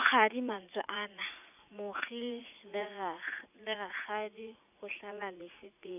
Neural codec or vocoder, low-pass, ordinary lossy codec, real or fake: vocoder, 44.1 kHz, 80 mel bands, Vocos; 3.6 kHz; none; fake